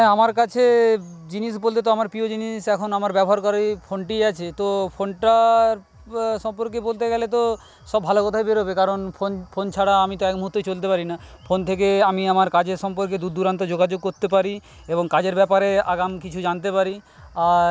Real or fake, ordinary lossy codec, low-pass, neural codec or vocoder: real; none; none; none